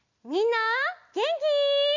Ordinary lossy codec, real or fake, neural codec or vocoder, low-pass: none; real; none; 7.2 kHz